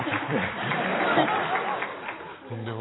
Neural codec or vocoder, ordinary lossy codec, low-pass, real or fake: none; AAC, 16 kbps; 7.2 kHz; real